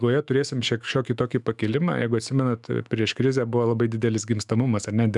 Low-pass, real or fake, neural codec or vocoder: 10.8 kHz; fake; autoencoder, 48 kHz, 128 numbers a frame, DAC-VAE, trained on Japanese speech